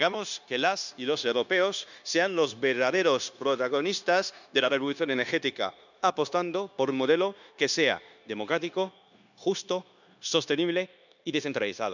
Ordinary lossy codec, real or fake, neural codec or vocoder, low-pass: none; fake; codec, 16 kHz, 0.9 kbps, LongCat-Audio-Codec; 7.2 kHz